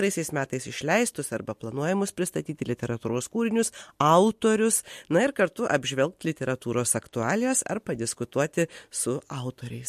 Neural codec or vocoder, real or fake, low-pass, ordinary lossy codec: none; real; 14.4 kHz; MP3, 64 kbps